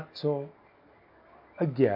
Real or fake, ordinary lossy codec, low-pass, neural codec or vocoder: real; MP3, 48 kbps; 5.4 kHz; none